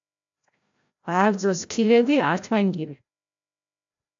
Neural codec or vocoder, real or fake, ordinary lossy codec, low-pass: codec, 16 kHz, 0.5 kbps, FreqCodec, larger model; fake; AAC, 64 kbps; 7.2 kHz